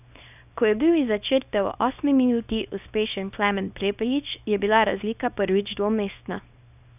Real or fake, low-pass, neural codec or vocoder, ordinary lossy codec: fake; 3.6 kHz; codec, 24 kHz, 0.9 kbps, WavTokenizer, small release; none